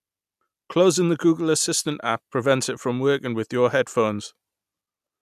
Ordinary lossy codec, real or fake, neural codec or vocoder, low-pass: none; real; none; 14.4 kHz